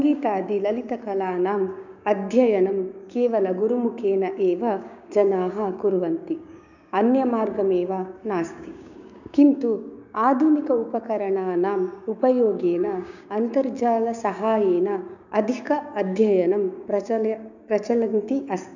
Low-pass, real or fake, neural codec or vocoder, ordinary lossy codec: 7.2 kHz; fake; codec, 16 kHz, 6 kbps, DAC; none